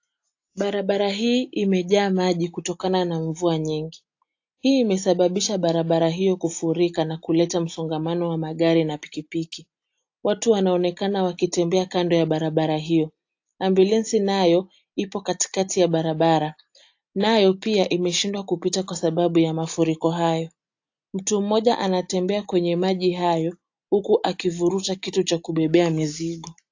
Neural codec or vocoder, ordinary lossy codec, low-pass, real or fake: none; AAC, 48 kbps; 7.2 kHz; real